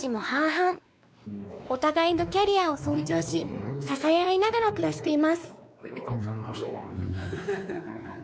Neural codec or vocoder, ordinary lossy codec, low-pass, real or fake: codec, 16 kHz, 2 kbps, X-Codec, WavLM features, trained on Multilingual LibriSpeech; none; none; fake